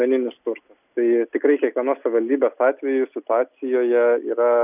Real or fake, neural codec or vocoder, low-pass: real; none; 3.6 kHz